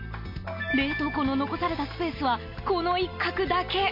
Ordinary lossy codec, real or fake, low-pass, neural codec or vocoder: MP3, 32 kbps; real; 5.4 kHz; none